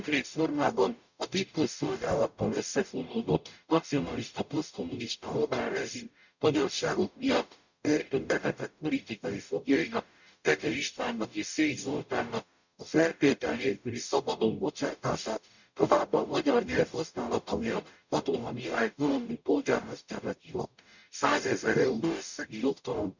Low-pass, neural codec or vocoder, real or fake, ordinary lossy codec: 7.2 kHz; codec, 44.1 kHz, 0.9 kbps, DAC; fake; none